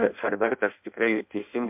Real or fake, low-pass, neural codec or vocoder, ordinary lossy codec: fake; 3.6 kHz; codec, 16 kHz in and 24 kHz out, 0.6 kbps, FireRedTTS-2 codec; MP3, 32 kbps